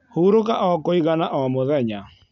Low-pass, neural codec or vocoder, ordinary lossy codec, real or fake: 7.2 kHz; none; none; real